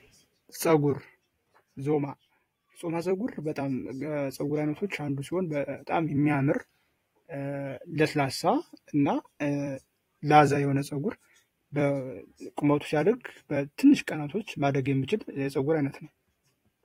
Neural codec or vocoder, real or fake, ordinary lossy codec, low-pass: vocoder, 44.1 kHz, 128 mel bands every 256 samples, BigVGAN v2; fake; AAC, 48 kbps; 19.8 kHz